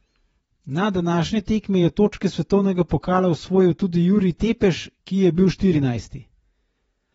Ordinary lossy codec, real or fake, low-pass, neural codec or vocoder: AAC, 24 kbps; fake; 19.8 kHz; vocoder, 48 kHz, 128 mel bands, Vocos